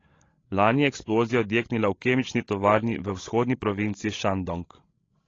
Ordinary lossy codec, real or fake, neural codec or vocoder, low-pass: AAC, 32 kbps; fake; codec, 16 kHz, 16 kbps, FreqCodec, larger model; 7.2 kHz